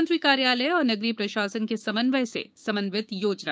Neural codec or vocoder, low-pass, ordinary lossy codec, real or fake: codec, 16 kHz, 6 kbps, DAC; none; none; fake